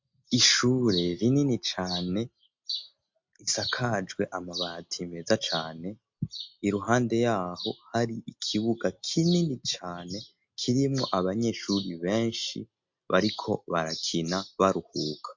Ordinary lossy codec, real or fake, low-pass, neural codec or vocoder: MP3, 48 kbps; real; 7.2 kHz; none